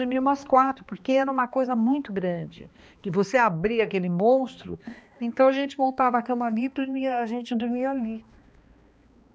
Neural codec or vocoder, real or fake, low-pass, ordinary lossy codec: codec, 16 kHz, 2 kbps, X-Codec, HuBERT features, trained on balanced general audio; fake; none; none